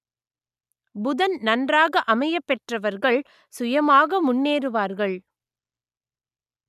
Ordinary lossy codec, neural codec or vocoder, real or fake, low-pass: none; none; real; 14.4 kHz